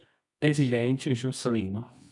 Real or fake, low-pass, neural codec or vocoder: fake; 10.8 kHz; codec, 24 kHz, 0.9 kbps, WavTokenizer, medium music audio release